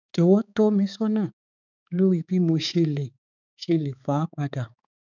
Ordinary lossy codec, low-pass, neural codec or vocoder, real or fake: none; 7.2 kHz; codec, 16 kHz, 4 kbps, X-Codec, HuBERT features, trained on balanced general audio; fake